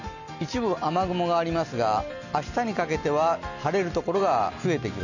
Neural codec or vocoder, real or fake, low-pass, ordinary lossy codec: none; real; 7.2 kHz; none